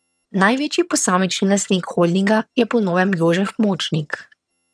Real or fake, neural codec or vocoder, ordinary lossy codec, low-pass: fake; vocoder, 22.05 kHz, 80 mel bands, HiFi-GAN; none; none